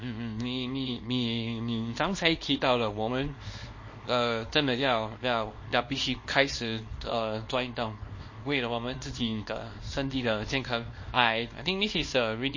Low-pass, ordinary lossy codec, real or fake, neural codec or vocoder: 7.2 kHz; MP3, 32 kbps; fake; codec, 24 kHz, 0.9 kbps, WavTokenizer, small release